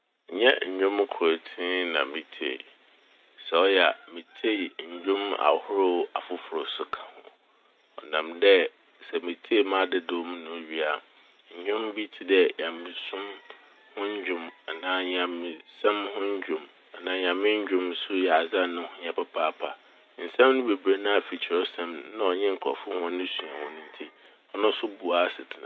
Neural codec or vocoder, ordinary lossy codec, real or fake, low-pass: none; none; real; none